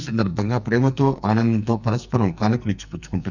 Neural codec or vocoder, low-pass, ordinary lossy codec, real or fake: codec, 44.1 kHz, 2.6 kbps, SNAC; 7.2 kHz; none; fake